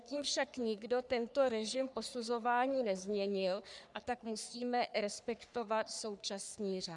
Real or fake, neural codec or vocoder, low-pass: fake; codec, 44.1 kHz, 3.4 kbps, Pupu-Codec; 10.8 kHz